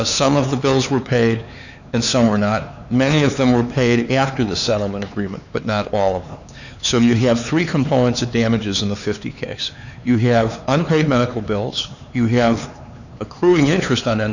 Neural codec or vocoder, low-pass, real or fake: codec, 16 kHz, 4 kbps, X-Codec, HuBERT features, trained on LibriSpeech; 7.2 kHz; fake